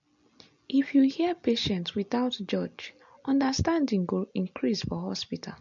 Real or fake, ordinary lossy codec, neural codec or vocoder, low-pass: real; MP3, 48 kbps; none; 7.2 kHz